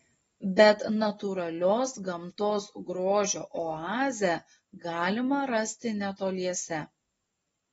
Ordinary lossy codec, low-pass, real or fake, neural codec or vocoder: AAC, 24 kbps; 19.8 kHz; real; none